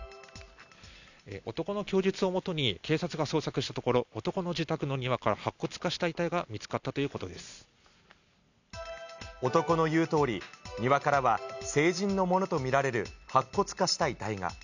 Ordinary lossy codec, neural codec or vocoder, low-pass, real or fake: none; none; 7.2 kHz; real